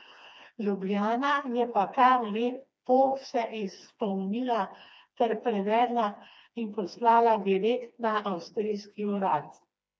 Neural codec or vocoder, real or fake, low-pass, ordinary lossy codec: codec, 16 kHz, 2 kbps, FreqCodec, smaller model; fake; none; none